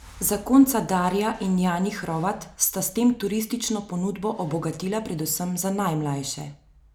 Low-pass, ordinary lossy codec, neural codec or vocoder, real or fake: none; none; none; real